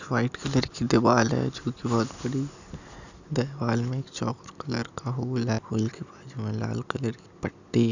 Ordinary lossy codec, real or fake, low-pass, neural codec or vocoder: none; real; 7.2 kHz; none